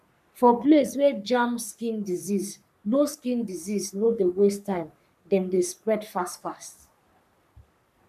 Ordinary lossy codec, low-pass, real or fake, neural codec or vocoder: none; 14.4 kHz; fake; codec, 44.1 kHz, 3.4 kbps, Pupu-Codec